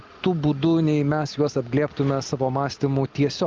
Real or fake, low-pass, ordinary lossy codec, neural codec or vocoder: real; 7.2 kHz; Opus, 32 kbps; none